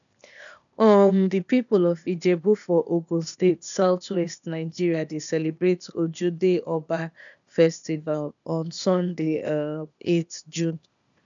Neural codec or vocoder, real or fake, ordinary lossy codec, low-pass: codec, 16 kHz, 0.8 kbps, ZipCodec; fake; none; 7.2 kHz